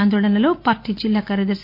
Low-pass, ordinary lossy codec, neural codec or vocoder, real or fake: 5.4 kHz; AAC, 48 kbps; none; real